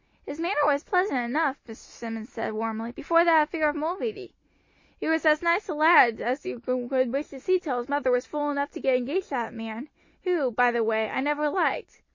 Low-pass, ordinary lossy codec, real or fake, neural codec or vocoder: 7.2 kHz; MP3, 32 kbps; real; none